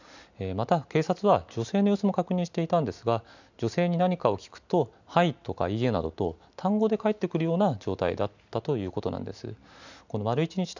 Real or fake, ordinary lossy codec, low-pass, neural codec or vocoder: real; none; 7.2 kHz; none